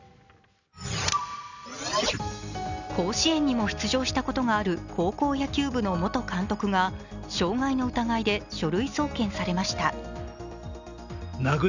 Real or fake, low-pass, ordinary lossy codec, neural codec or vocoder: real; 7.2 kHz; none; none